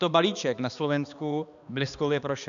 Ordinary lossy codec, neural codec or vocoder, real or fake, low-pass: MP3, 96 kbps; codec, 16 kHz, 2 kbps, X-Codec, HuBERT features, trained on balanced general audio; fake; 7.2 kHz